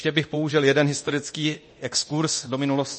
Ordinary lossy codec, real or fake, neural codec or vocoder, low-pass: MP3, 32 kbps; fake; codec, 24 kHz, 0.9 kbps, DualCodec; 10.8 kHz